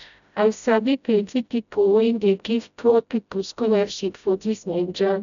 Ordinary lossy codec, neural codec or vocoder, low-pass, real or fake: none; codec, 16 kHz, 0.5 kbps, FreqCodec, smaller model; 7.2 kHz; fake